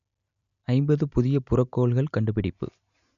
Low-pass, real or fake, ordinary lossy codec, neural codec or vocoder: 7.2 kHz; real; none; none